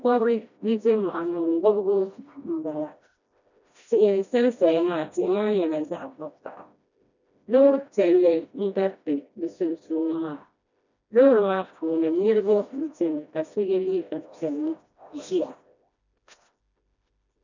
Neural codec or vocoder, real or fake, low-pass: codec, 16 kHz, 1 kbps, FreqCodec, smaller model; fake; 7.2 kHz